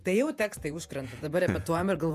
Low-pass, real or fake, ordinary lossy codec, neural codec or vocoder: 14.4 kHz; fake; MP3, 96 kbps; vocoder, 48 kHz, 128 mel bands, Vocos